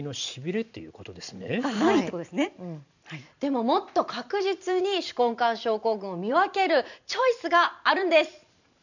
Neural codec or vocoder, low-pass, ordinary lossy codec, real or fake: vocoder, 44.1 kHz, 128 mel bands every 512 samples, BigVGAN v2; 7.2 kHz; none; fake